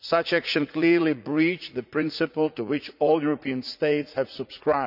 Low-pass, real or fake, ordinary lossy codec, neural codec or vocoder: 5.4 kHz; fake; none; vocoder, 44.1 kHz, 80 mel bands, Vocos